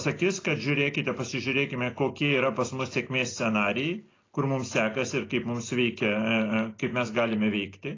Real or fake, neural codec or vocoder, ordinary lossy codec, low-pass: real; none; AAC, 32 kbps; 7.2 kHz